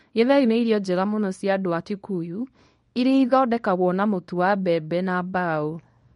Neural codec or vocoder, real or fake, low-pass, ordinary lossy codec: codec, 24 kHz, 0.9 kbps, WavTokenizer, small release; fake; 10.8 kHz; MP3, 48 kbps